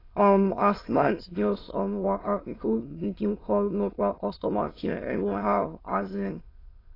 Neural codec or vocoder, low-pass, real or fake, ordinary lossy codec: autoencoder, 22.05 kHz, a latent of 192 numbers a frame, VITS, trained on many speakers; 5.4 kHz; fake; AAC, 24 kbps